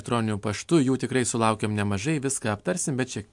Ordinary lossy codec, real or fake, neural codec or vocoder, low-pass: MP3, 64 kbps; real; none; 10.8 kHz